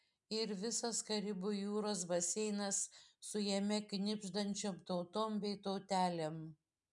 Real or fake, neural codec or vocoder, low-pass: real; none; 10.8 kHz